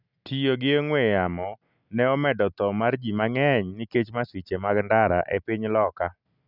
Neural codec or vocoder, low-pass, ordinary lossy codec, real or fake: none; 5.4 kHz; none; real